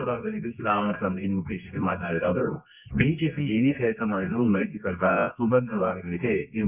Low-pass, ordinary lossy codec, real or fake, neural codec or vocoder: 3.6 kHz; none; fake; codec, 24 kHz, 0.9 kbps, WavTokenizer, medium music audio release